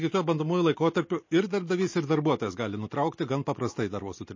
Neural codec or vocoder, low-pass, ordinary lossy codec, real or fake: none; 7.2 kHz; MP3, 32 kbps; real